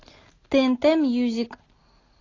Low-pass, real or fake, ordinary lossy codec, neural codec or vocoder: 7.2 kHz; real; AAC, 32 kbps; none